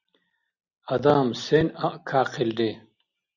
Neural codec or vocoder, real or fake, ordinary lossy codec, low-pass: none; real; Opus, 64 kbps; 7.2 kHz